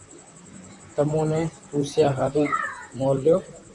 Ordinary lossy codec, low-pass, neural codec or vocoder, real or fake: MP3, 96 kbps; 10.8 kHz; vocoder, 44.1 kHz, 128 mel bands, Pupu-Vocoder; fake